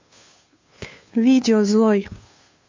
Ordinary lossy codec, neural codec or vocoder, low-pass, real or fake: MP3, 48 kbps; codec, 16 kHz, 2 kbps, FunCodec, trained on Chinese and English, 25 frames a second; 7.2 kHz; fake